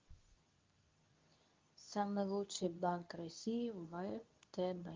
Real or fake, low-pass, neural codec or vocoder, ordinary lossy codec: fake; 7.2 kHz; codec, 24 kHz, 0.9 kbps, WavTokenizer, medium speech release version 2; Opus, 24 kbps